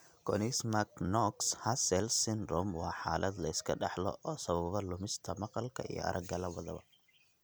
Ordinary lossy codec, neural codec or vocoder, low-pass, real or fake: none; none; none; real